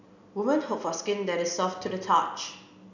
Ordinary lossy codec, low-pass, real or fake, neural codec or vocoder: none; 7.2 kHz; real; none